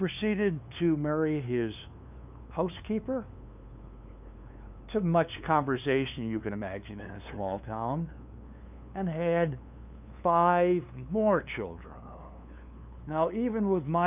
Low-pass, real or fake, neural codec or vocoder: 3.6 kHz; fake; codec, 16 kHz, 2 kbps, FunCodec, trained on LibriTTS, 25 frames a second